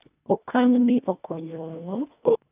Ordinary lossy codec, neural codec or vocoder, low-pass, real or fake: none; codec, 24 kHz, 1.5 kbps, HILCodec; 3.6 kHz; fake